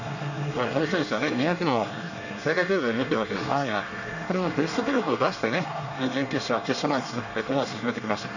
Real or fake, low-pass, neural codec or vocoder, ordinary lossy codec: fake; 7.2 kHz; codec, 24 kHz, 1 kbps, SNAC; none